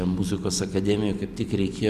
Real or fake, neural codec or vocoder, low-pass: real; none; 14.4 kHz